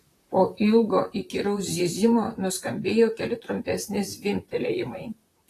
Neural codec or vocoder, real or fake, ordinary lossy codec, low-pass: vocoder, 44.1 kHz, 128 mel bands, Pupu-Vocoder; fake; AAC, 48 kbps; 14.4 kHz